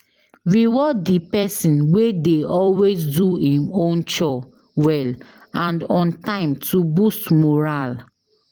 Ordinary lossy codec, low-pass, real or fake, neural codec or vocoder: Opus, 24 kbps; 19.8 kHz; fake; vocoder, 44.1 kHz, 128 mel bands every 256 samples, BigVGAN v2